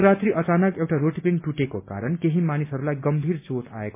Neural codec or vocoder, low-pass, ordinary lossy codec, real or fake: none; 3.6 kHz; MP3, 24 kbps; real